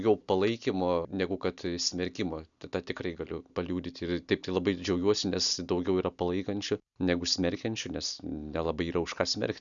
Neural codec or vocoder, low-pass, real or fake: none; 7.2 kHz; real